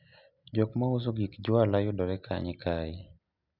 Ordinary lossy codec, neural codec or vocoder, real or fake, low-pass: none; none; real; 5.4 kHz